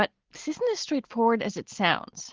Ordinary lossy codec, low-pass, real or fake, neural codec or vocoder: Opus, 16 kbps; 7.2 kHz; real; none